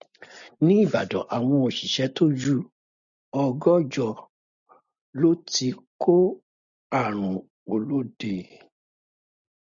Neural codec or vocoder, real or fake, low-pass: none; real; 7.2 kHz